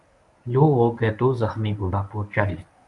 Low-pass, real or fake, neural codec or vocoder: 10.8 kHz; fake; codec, 24 kHz, 0.9 kbps, WavTokenizer, medium speech release version 1